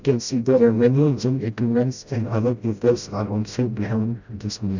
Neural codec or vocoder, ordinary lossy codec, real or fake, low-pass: codec, 16 kHz, 0.5 kbps, FreqCodec, smaller model; none; fake; 7.2 kHz